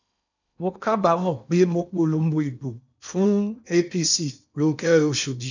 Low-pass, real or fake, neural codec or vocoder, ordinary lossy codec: 7.2 kHz; fake; codec, 16 kHz in and 24 kHz out, 0.8 kbps, FocalCodec, streaming, 65536 codes; none